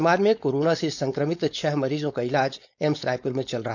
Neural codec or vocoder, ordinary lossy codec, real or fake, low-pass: codec, 16 kHz, 4.8 kbps, FACodec; none; fake; 7.2 kHz